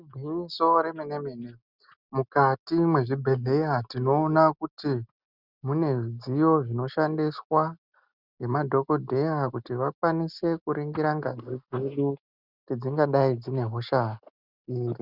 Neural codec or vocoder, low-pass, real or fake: none; 5.4 kHz; real